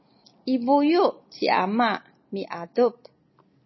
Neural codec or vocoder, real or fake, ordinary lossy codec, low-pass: none; real; MP3, 24 kbps; 7.2 kHz